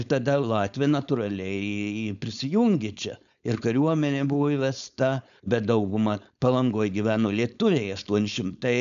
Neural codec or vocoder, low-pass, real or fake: codec, 16 kHz, 4.8 kbps, FACodec; 7.2 kHz; fake